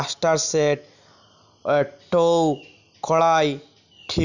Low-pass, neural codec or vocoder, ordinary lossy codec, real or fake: 7.2 kHz; none; none; real